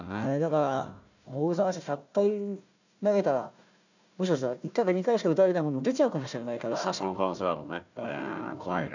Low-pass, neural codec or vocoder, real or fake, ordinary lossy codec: 7.2 kHz; codec, 16 kHz, 1 kbps, FunCodec, trained on Chinese and English, 50 frames a second; fake; none